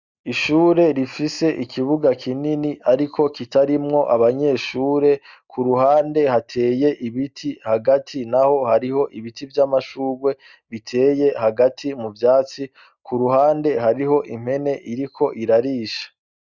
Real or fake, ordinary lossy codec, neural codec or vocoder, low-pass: real; Opus, 64 kbps; none; 7.2 kHz